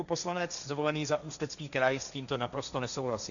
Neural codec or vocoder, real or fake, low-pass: codec, 16 kHz, 1.1 kbps, Voila-Tokenizer; fake; 7.2 kHz